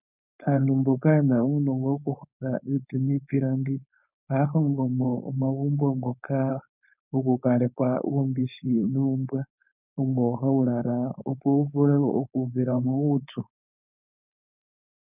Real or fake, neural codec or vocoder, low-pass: fake; codec, 16 kHz, 4.8 kbps, FACodec; 3.6 kHz